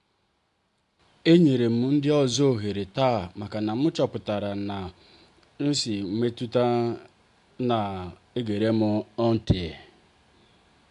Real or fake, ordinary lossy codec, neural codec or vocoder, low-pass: real; AAC, 64 kbps; none; 10.8 kHz